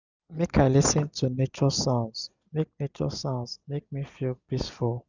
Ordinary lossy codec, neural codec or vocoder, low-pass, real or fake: none; none; 7.2 kHz; real